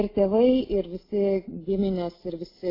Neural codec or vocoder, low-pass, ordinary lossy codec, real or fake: none; 5.4 kHz; AAC, 24 kbps; real